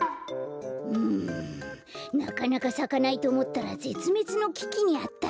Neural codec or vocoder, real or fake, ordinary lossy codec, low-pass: none; real; none; none